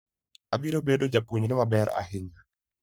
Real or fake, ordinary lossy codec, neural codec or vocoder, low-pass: fake; none; codec, 44.1 kHz, 3.4 kbps, Pupu-Codec; none